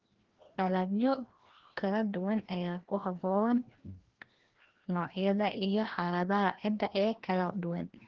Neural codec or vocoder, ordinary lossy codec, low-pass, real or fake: codec, 16 kHz, 1 kbps, FreqCodec, larger model; Opus, 16 kbps; 7.2 kHz; fake